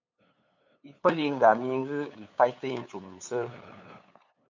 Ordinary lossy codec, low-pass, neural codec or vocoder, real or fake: MP3, 64 kbps; 7.2 kHz; codec, 16 kHz, 8 kbps, FunCodec, trained on LibriTTS, 25 frames a second; fake